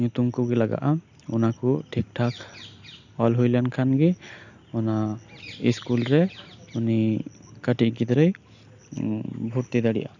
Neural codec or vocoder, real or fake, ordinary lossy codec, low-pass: none; real; none; 7.2 kHz